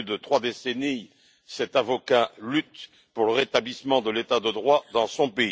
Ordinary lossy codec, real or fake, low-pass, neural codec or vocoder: none; real; none; none